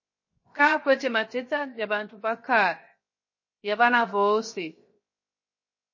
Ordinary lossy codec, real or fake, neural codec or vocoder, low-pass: MP3, 32 kbps; fake; codec, 16 kHz, 0.7 kbps, FocalCodec; 7.2 kHz